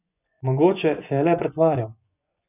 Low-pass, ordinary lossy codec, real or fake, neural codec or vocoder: 3.6 kHz; none; fake; vocoder, 24 kHz, 100 mel bands, Vocos